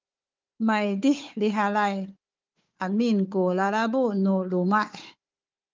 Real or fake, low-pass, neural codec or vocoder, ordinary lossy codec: fake; 7.2 kHz; codec, 16 kHz, 4 kbps, FunCodec, trained on Chinese and English, 50 frames a second; Opus, 24 kbps